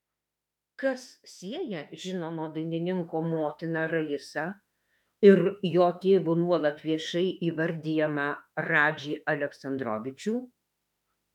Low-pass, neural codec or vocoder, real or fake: 19.8 kHz; autoencoder, 48 kHz, 32 numbers a frame, DAC-VAE, trained on Japanese speech; fake